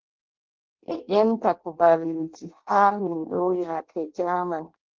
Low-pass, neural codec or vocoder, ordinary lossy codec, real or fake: 7.2 kHz; codec, 16 kHz in and 24 kHz out, 0.6 kbps, FireRedTTS-2 codec; Opus, 16 kbps; fake